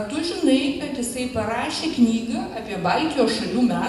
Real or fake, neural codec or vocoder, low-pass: real; none; 14.4 kHz